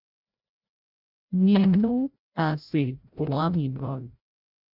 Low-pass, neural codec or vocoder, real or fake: 5.4 kHz; codec, 16 kHz, 0.5 kbps, FreqCodec, larger model; fake